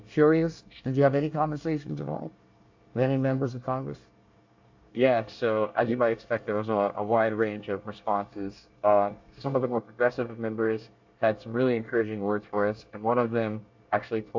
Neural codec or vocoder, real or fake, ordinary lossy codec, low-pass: codec, 24 kHz, 1 kbps, SNAC; fake; AAC, 48 kbps; 7.2 kHz